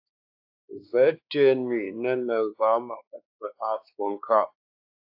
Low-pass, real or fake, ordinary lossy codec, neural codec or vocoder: 5.4 kHz; fake; AAC, 48 kbps; codec, 16 kHz, 2 kbps, X-Codec, WavLM features, trained on Multilingual LibriSpeech